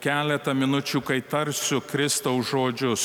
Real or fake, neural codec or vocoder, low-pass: real; none; 19.8 kHz